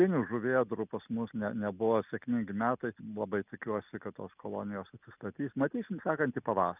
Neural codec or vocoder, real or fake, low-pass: none; real; 3.6 kHz